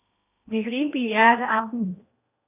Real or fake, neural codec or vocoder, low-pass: fake; codec, 16 kHz in and 24 kHz out, 0.8 kbps, FocalCodec, streaming, 65536 codes; 3.6 kHz